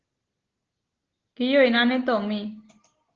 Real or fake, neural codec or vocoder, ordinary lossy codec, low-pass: real; none; Opus, 16 kbps; 7.2 kHz